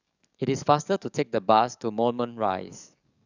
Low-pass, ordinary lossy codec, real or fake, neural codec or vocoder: 7.2 kHz; none; fake; codec, 44.1 kHz, 7.8 kbps, DAC